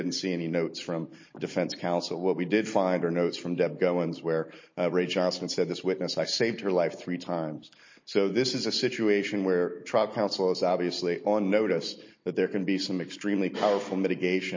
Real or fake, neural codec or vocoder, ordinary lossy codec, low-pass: real; none; MP3, 32 kbps; 7.2 kHz